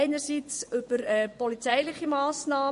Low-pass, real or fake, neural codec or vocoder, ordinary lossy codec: 10.8 kHz; fake; vocoder, 24 kHz, 100 mel bands, Vocos; MP3, 48 kbps